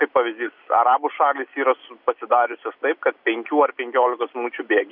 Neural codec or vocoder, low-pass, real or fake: none; 5.4 kHz; real